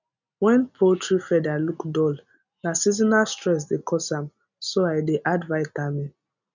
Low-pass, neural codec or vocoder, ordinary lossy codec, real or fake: 7.2 kHz; none; none; real